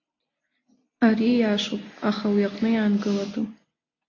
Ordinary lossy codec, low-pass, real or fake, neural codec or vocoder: AAC, 32 kbps; 7.2 kHz; real; none